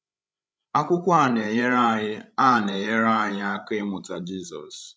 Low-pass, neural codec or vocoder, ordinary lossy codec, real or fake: none; codec, 16 kHz, 16 kbps, FreqCodec, larger model; none; fake